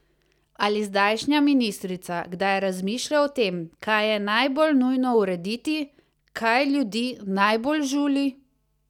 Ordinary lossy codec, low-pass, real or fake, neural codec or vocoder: none; 19.8 kHz; real; none